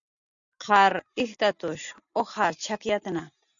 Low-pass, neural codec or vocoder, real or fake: 7.2 kHz; none; real